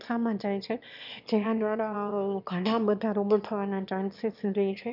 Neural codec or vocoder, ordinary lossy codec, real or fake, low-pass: autoencoder, 22.05 kHz, a latent of 192 numbers a frame, VITS, trained on one speaker; none; fake; 5.4 kHz